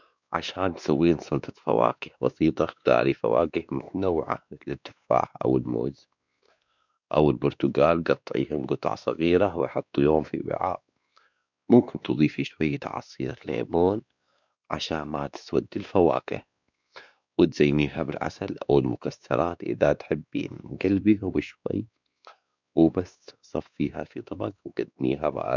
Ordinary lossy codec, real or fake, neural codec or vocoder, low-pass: none; fake; codec, 16 kHz, 2 kbps, X-Codec, WavLM features, trained on Multilingual LibriSpeech; 7.2 kHz